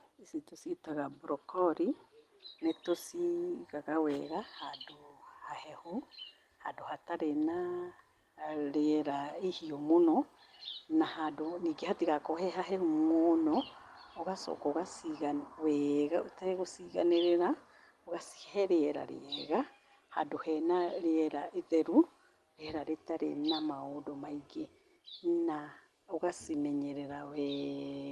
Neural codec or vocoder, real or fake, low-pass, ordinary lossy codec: none; real; 14.4 kHz; Opus, 24 kbps